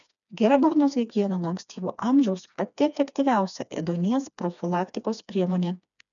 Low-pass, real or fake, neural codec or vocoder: 7.2 kHz; fake; codec, 16 kHz, 2 kbps, FreqCodec, smaller model